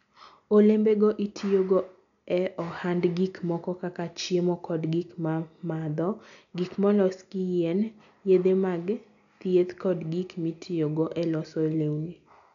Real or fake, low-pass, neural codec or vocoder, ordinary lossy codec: real; 7.2 kHz; none; none